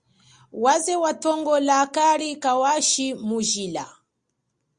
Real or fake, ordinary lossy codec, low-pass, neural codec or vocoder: real; Opus, 64 kbps; 9.9 kHz; none